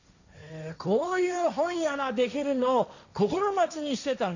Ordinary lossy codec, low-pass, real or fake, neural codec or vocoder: none; 7.2 kHz; fake; codec, 16 kHz, 1.1 kbps, Voila-Tokenizer